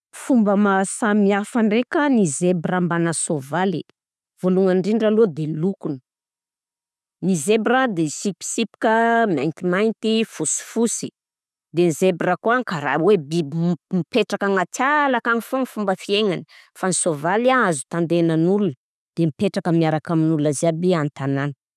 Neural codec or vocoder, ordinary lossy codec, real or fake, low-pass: none; none; real; none